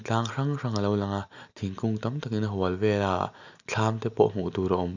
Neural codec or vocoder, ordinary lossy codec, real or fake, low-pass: none; none; real; 7.2 kHz